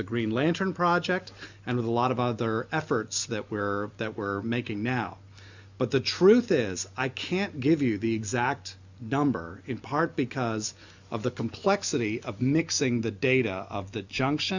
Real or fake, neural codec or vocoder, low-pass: real; none; 7.2 kHz